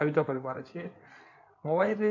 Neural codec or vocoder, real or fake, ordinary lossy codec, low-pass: codec, 16 kHz in and 24 kHz out, 2.2 kbps, FireRedTTS-2 codec; fake; none; 7.2 kHz